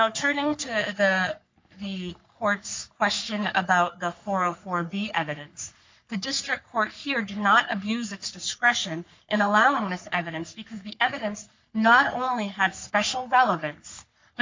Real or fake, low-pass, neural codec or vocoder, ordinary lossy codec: fake; 7.2 kHz; codec, 44.1 kHz, 3.4 kbps, Pupu-Codec; MP3, 64 kbps